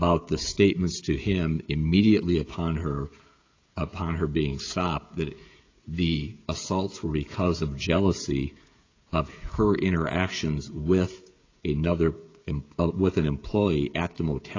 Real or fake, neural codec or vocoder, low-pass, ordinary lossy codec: fake; codec, 16 kHz, 16 kbps, FreqCodec, smaller model; 7.2 kHz; AAC, 32 kbps